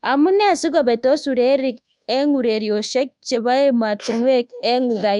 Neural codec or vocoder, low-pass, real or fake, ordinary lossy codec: codec, 24 kHz, 1.2 kbps, DualCodec; 10.8 kHz; fake; none